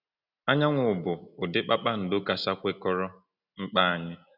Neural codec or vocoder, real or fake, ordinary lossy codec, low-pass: none; real; none; 5.4 kHz